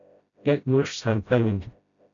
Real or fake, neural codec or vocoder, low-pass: fake; codec, 16 kHz, 0.5 kbps, FreqCodec, smaller model; 7.2 kHz